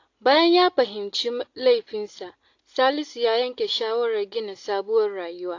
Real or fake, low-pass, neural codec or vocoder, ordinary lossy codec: real; 7.2 kHz; none; AAC, 48 kbps